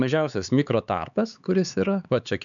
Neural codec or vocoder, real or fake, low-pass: codec, 16 kHz, 4 kbps, X-Codec, HuBERT features, trained on LibriSpeech; fake; 7.2 kHz